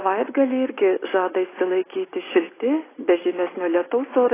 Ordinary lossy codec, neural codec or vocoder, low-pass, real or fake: AAC, 16 kbps; none; 3.6 kHz; real